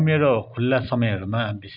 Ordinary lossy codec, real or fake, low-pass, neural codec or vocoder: none; real; 5.4 kHz; none